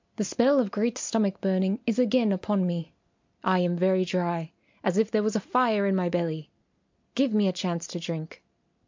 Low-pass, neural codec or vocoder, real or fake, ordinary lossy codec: 7.2 kHz; none; real; MP3, 64 kbps